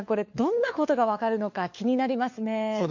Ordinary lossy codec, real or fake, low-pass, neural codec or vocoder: MP3, 48 kbps; fake; 7.2 kHz; codec, 16 kHz, 4 kbps, FunCodec, trained on LibriTTS, 50 frames a second